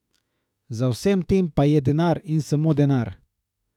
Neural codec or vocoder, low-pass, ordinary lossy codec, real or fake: autoencoder, 48 kHz, 32 numbers a frame, DAC-VAE, trained on Japanese speech; 19.8 kHz; none; fake